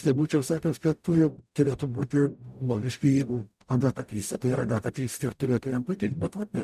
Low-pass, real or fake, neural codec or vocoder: 14.4 kHz; fake; codec, 44.1 kHz, 0.9 kbps, DAC